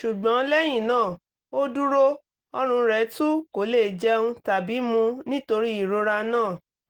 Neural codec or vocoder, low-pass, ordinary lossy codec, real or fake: none; 19.8 kHz; Opus, 16 kbps; real